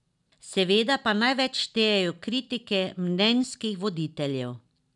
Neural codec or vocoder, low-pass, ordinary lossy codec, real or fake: none; 10.8 kHz; none; real